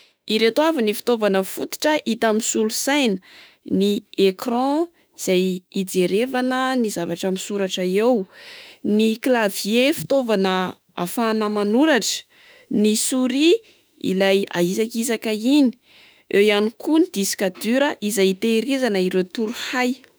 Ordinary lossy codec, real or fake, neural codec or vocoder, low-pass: none; fake; autoencoder, 48 kHz, 32 numbers a frame, DAC-VAE, trained on Japanese speech; none